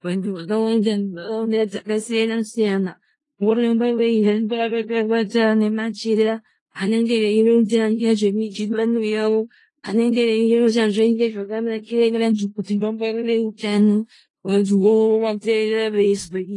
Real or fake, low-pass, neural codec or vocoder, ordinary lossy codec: fake; 10.8 kHz; codec, 16 kHz in and 24 kHz out, 0.4 kbps, LongCat-Audio-Codec, four codebook decoder; AAC, 32 kbps